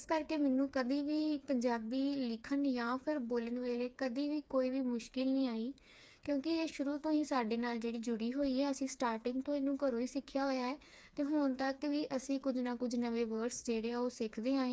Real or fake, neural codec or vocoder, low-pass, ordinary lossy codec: fake; codec, 16 kHz, 4 kbps, FreqCodec, smaller model; none; none